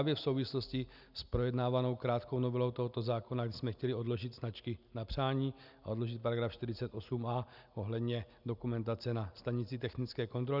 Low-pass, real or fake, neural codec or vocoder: 5.4 kHz; real; none